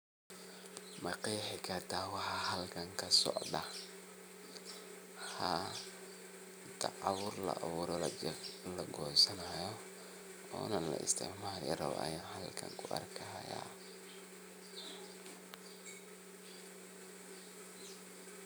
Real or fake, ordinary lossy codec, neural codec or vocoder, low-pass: real; none; none; none